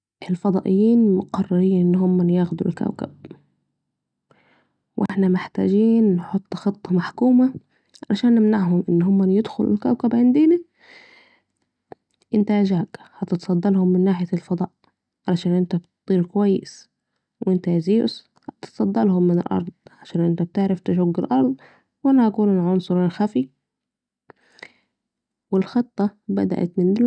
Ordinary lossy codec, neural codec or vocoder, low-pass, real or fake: none; none; 9.9 kHz; real